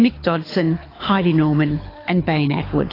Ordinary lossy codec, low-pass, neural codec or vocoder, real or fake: AAC, 24 kbps; 5.4 kHz; codec, 24 kHz, 6 kbps, HILCodec; fake